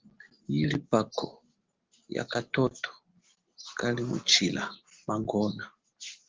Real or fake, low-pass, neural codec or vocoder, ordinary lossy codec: real; 7.2 kHz; none; Opus, 16 kbps